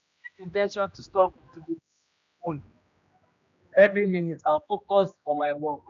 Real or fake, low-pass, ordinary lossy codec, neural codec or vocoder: fake; 7.2 kHz; MP3, 64 kbps; codec, 16 kHz, 1 kbps, X-Codec, HuBERT features, trained on general audio